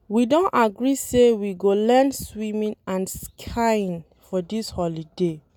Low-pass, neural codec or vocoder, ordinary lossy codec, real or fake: 19.8 kHz; none; none; real